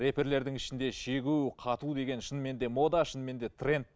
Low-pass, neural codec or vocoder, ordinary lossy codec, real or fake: none; none; none; real